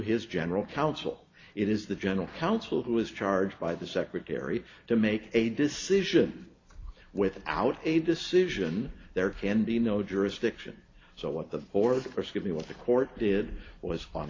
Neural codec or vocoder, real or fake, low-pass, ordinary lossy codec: none; real; 7.2 kHz; AAC, 32 kbps